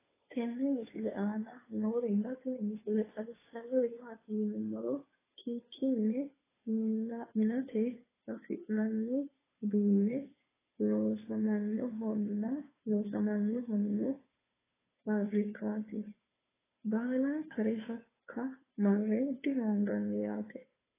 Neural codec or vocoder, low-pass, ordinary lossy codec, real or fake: codec, 16 kHz, 2 kbps, FunCodec, trained on Chinese and English, 25 frames a second; 3.6 kHz; AAC, 16 kbps; fake